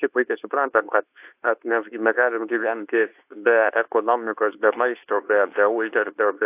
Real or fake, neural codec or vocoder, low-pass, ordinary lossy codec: fake; codec, 24 kHz, 0.9 kbps, WavTokenizer, medium speech release version 2; 3.6 kHz; AAC, 32 kbps